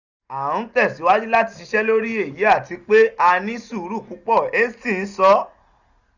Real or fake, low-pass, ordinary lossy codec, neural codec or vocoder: real; 7.2 kHz; none; none